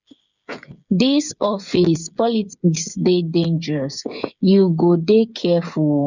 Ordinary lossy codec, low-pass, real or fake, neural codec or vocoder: none; 7.2 kHz; fake; codec, 16 kHz, 8 kbps, FreqCodec, smaller model